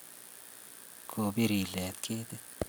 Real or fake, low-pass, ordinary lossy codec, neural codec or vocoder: real; none; none; none